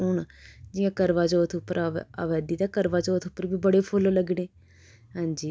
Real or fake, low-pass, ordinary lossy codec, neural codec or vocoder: real; none; none; none